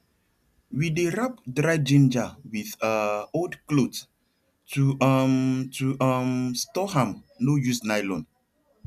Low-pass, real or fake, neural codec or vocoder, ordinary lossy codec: 14.4 kHz; real; none; none